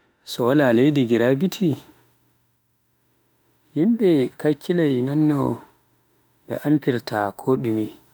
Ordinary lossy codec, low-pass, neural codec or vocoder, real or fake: none; none; autoencoder, 48 kHz, 32 numbers a frame, DAC-VAE, trained on Japanese speech; fake